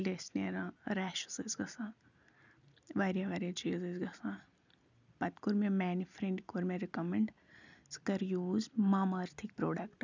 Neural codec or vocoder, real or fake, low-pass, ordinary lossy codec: none; real; 7.2 kHz; none